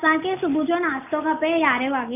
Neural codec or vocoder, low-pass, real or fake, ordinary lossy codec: none; 3.6 kHz; real; none